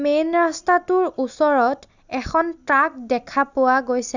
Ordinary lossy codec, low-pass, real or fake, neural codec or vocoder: none; 7.2 kHz; real; none